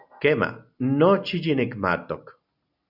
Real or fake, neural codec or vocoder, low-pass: real; none; 5.4 kHz